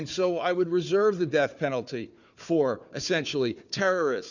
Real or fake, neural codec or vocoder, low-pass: fake; codec, 16 kHz, 4 kbps, FunCodec, trained on Chinese and English, 50 frames a second; 7.2 kHz